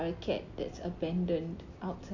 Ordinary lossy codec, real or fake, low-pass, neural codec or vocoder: none; real; 7.2 kHz; none